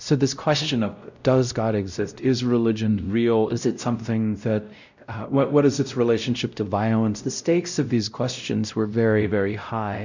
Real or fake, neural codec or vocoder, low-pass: fake; codec, 16 kHz, 0.5 kbps, X-Codec, WavLM features, trained on Multilingual LibriSpeech; 7.2 kHz